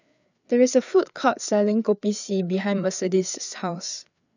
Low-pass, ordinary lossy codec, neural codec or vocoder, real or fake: 7.2 kHz; none; codec, 16 kHz, 4 kbps, FreqCodec, larger model; fake